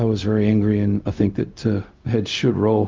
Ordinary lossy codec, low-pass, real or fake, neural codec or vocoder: Opus, 32 kbps; 7.2 kHz; fake; codec, 16 kHz, 0.4 kbps, LongCat-Audio-Codec